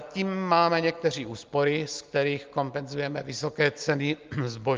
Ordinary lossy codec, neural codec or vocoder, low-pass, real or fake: Opus, 32 kbps; none; 7.2 kHz; real